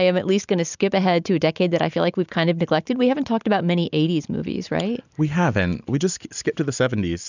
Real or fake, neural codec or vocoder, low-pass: real; none; 7.2 kHz